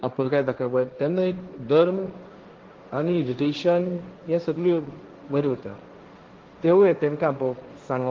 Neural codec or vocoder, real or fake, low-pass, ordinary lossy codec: codec, 16 kHz, 1.1 kbps, Voila-Tokenizer; fake; 7.2 kHz; Opus, 16 kbps